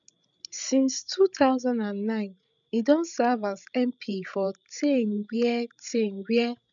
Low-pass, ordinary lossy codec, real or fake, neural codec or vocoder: 7.2 kHz; none; fake; codec, 16 kHz, 16 kbps, FreqCodec, larger model